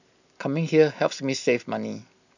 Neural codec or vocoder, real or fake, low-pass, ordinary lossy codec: none; real; 7.2 kHz; none